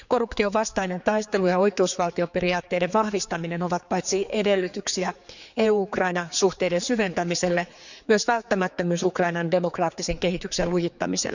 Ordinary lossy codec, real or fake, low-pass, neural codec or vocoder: none; fake; 7.2 kHz; codec, 16 kHz, 4 kbps, X-Codec, HuBERT features, trained on general audio